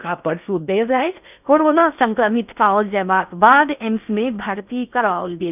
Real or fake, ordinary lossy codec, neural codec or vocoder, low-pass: fake; none; codec, 16 kHz in and 24 kHz out, 0.6 kbps, FocalCodec, streaming, 2048 codes; 3.6 kHz